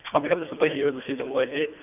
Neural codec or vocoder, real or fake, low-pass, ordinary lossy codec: codec, 24 kHz, 1.5 kbps, HILCodec; fake; 3.6 kHz; none